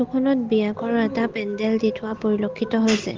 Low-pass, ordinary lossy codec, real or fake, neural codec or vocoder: 7.2 kHz; Opus, 16 kbps; real; none